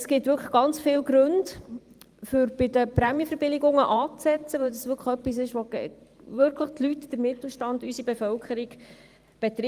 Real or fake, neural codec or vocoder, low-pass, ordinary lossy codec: fake; vocoder, 44.1 kHz, 128 mel bands every 512 samples, BigVGAN v2; 14.4 kHz; Opus, 32 kbps